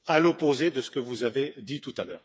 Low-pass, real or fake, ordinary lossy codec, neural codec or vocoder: none; fake; none; codec, 16 kHz, 4 kbps, FreqCodec, smaller model